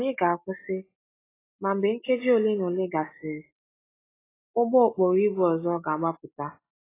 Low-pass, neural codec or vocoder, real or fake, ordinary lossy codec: 3.6 kHz; none; real; AAC, 16 kbps